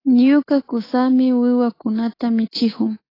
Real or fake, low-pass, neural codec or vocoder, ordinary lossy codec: fake; 5.4 kHz; codec, 24 kHz, 3.1 kbps, DualCodec; AAC, 24 kbps